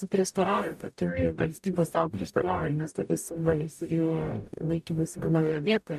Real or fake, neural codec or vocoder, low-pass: fake; codec, 44.1 kHz, 0.9 kbps, DAC; 14.4 kHz